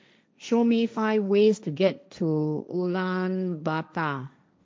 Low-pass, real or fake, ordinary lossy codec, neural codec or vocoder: none; fake; none; codec, 16 kHz, 1.1 kbps, Voila-Tokenizer